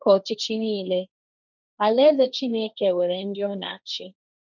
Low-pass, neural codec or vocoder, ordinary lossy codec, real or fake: 7.2 kHz; codec, 16 kHz, 1.1 kbps, Voila-Tokenizer; none; fake